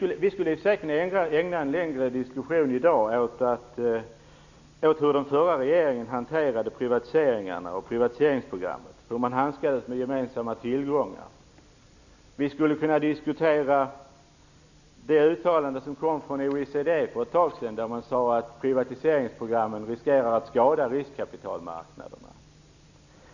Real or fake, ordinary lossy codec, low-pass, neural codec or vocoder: real; none; 7.2 kHz; none